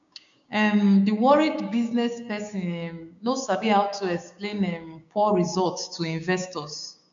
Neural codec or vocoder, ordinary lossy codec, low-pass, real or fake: codec, 16 kHz, 6 kbps, DAC; MP3, 64 kbps; 7.2 kHz; fake